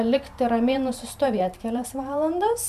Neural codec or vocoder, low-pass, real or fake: vocoder, 44.1 kHz, 128 mel bands every 512 samples, BigVGAN v2; 14.4 kHz; fake